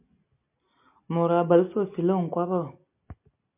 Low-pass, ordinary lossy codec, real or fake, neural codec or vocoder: 3.6 kHz; MP3, 32 kbps; real; none